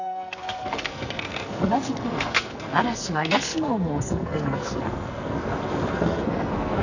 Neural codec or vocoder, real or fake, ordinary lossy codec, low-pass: codec, 44.1 kHz, 2.6 kbps, SNAC; fake; none; 7.2 kHz